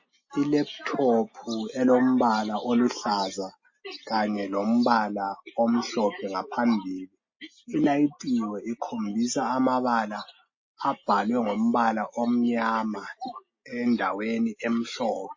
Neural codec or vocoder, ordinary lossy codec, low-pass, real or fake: none; MP3, 32 kbps; 7.2 kHz; real